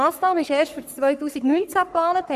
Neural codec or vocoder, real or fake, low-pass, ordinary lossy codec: codec, 44.1 kHz, 3.4 kbps, Pupu-Codec; fake; 14.4 kHz; none